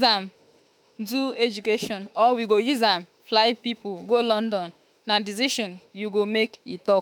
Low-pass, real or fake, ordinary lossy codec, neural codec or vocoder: none; fake; none; autoencoder, 48 kHz, 32 numbers a frame, DAC-VAE, trained on Japanese speech